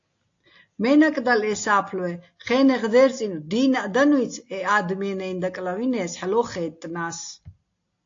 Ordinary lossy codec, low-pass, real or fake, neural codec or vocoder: AAC, 48 kbps; 7.2 kHz; real; none